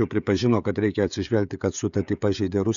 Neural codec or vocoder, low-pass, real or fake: codec, 16 kHz, 16 kbps, FreqCodec, smaller model; 7.2 kHz; fake